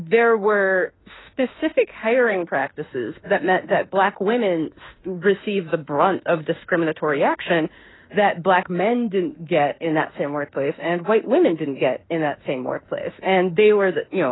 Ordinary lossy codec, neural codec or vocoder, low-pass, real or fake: AAC, 16 kbps; autoencoder, 48 kHz, 32 numbers a frame, DAC-VAE, trained on Japanese speech; 7.2 kHz; fake